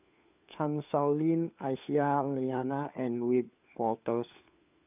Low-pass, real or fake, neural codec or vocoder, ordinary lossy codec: 3.6 kHz; fake; codec, 16 kHz, 4 kbps, FunCodec, trained on LibriTTS, 50 frames a second; none